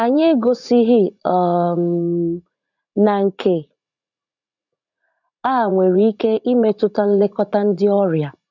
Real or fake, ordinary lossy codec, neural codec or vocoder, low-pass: fake; none; vocoder, 44.1 kHz, 80 mel bands, Vocos; 7.2 kHz